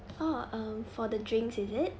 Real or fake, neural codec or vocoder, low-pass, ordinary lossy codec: real; none; none; none